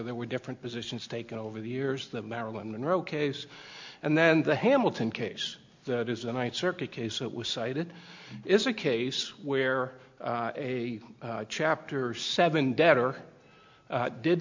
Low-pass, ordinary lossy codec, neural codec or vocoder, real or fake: 7.2 kHz; MP3, 64 kbps; none; real